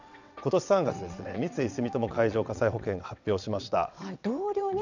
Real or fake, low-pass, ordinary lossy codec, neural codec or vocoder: fake; 7.2 kHz; none; vocoder, 22.05 kHz, 80 mel bands, WaveNeXt